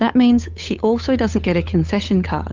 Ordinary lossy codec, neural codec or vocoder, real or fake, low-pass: Opus, 32 kbps; codec, 16 kHz, 8 kbps, FunCodec, trained on Chinese and English, 25 frames a second; fake; 7.2 kHz